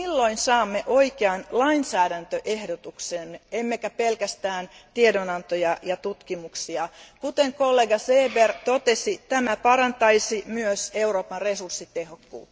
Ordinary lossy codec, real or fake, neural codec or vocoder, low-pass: none; real; none; none